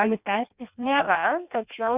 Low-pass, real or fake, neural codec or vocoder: 3.6 kHz; fake; codec, 16 kHz in and 24 kHz out, 0.6 kbps, FireRedTTS-2 codec